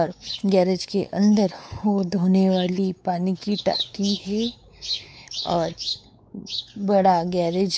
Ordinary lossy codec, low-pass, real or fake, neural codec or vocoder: none; none; fake; codec, 16 kHz, 4 kbps, X-Codec, WavLM features, trained on Multilingual LibriSpeech